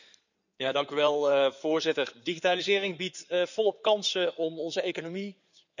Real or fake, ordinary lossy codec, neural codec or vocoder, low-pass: fake; none; codec, 16 kHz in and 24 kHz out, 2.2 kbps, FireRedTTS-2 codec; 7.2 kHz